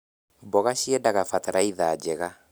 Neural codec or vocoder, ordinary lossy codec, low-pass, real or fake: none; none; none; real